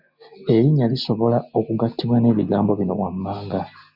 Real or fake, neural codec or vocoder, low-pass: real; none; 5.4 kHz